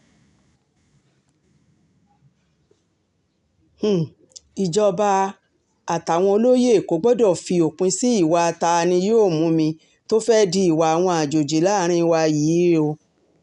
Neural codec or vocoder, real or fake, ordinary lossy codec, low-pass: none; real; none; 10.8 kHz